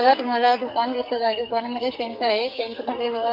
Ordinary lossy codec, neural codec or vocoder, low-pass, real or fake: none; codec, 44.1 kHz, 2.6 kbps, SNAC; 5.4 kHz; fake